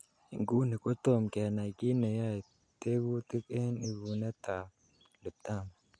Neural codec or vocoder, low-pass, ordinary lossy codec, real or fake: none; 9.9 kHz; none; real